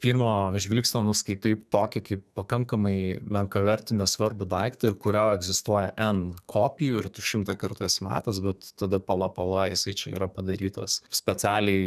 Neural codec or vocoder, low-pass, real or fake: codec, 32 kHz, 1.9 kbps, SNAC; 14.4 kHz; fake